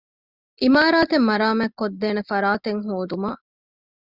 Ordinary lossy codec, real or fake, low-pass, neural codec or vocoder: Opus, 64 kbps; real; 5.4 kHz; none